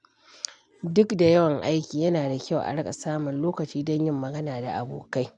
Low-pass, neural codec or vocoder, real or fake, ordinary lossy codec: 10.8 kHz; none; real; none